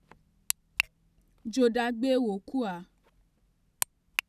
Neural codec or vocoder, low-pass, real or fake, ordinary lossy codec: none; 14.4 kHz; real; none